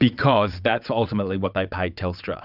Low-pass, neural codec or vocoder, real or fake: 5.4 kHz; vocoder, 22.05 kHz, 80 mel bands, WaveNeXt; fake